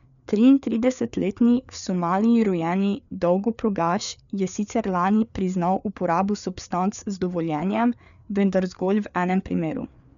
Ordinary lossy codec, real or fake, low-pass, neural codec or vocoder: none; fake; 7.2 kHz; codec, 16 kHz, 4 kbps, FreqCodec, larger model